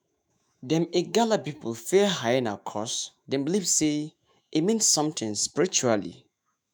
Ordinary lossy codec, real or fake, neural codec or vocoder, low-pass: none; fake; autoencoder, 48 kHz, 128 numbers a frame, DAC-VAE, trained on Japanese speech; none